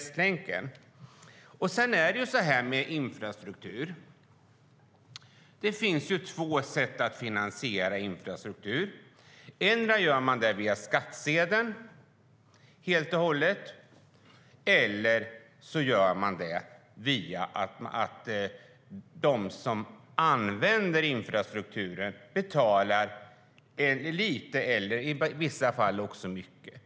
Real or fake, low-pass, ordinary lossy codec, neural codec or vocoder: real; none; none; none